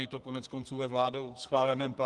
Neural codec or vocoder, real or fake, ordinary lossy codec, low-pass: codec, 32 kHz, 1.9 kbps, SNAC; fake; Opus, 24 kbps; 10.8 kHz